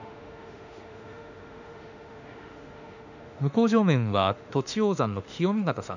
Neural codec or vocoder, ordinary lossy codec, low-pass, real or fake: autoencoder, 48 kHz, 32 numbers a frame, DAC-VAE, trained on Japanese speech; none; 7.2 kHz; fake